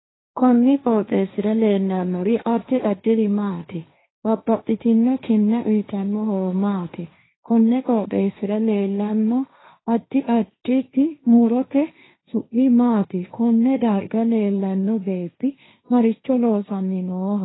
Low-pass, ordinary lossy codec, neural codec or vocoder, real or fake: 7.2 kHz; AAC, 16 kbps; codec, 16 kHz, 1.1 kbps, Voila-Tokenizer; fake